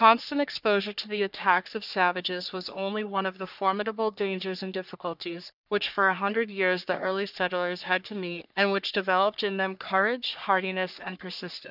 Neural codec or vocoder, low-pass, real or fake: codec, 44.1 kHz, 3.4 kbps, Pupu-Codec; 5.4 kHz; fake